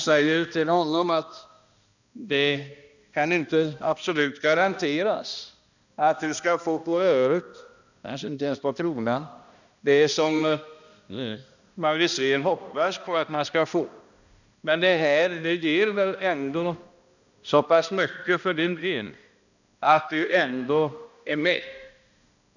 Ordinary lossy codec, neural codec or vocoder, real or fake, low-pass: none; codec, 16 kHz, 1 kbps, X-Codec, HuBERT features, trained on balanced general audio; fake; 7.2 kHz